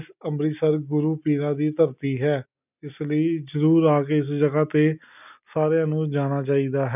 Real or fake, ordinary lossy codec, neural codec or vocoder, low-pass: real; none; none; 3.6 kHz